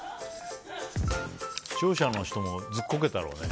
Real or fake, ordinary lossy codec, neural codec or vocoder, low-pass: real; none; none; none